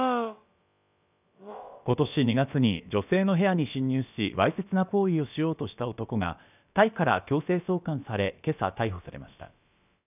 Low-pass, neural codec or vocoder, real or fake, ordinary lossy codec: 3.6 kHz; codec, 16 kHz, about 1 kbps, DyCAST, with the encoder's durations; fake; none